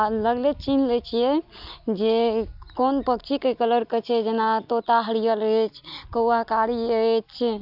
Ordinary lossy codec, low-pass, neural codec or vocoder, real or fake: AAC, 48 kbps; 5.4 kHz; none; real